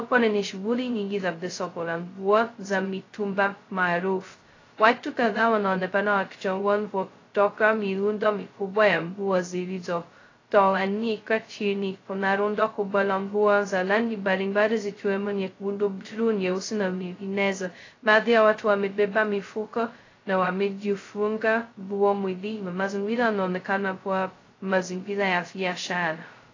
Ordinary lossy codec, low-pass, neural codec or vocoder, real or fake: AAC, 32 kbps; 7.2 kHz; codec, 16 kHz, 0.2 kbps, FocalCodec; fake